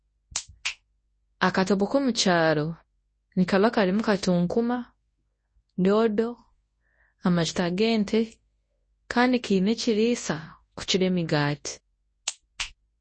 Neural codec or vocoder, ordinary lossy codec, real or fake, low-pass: codec, 24 kHz, 0.9 kbps, WavTokenizer, large speech release; MP3, 32 kbps; fake; 9.9 kHz